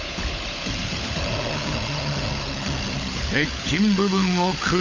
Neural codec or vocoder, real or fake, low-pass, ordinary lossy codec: codec, 16 kHz, 16 kbps, FunCodec, trained on LibriTTS, 50 frames a second; fake; 7.2 kHz; AAC, 48 kbps